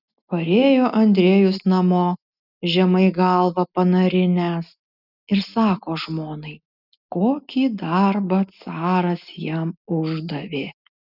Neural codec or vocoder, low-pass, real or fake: none; 5.4 kHz; real